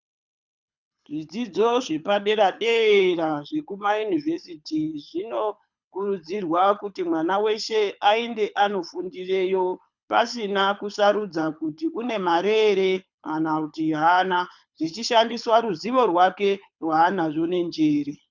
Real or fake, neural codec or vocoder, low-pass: fake; codec, 24 kHz, 6 kbps, HILCodec; 7.2 kHz